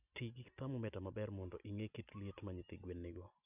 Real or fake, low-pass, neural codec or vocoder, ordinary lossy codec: real; 3.6 kHz; none; none